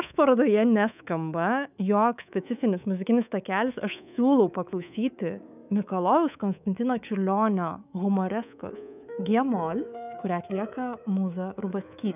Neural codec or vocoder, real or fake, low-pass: codec, 24 kHz, 3.1 kbps, DualCodec; fake; 3.6 kHz